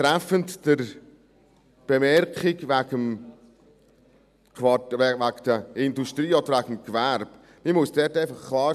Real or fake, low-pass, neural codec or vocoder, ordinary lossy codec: real; 14.4 kHz; none; none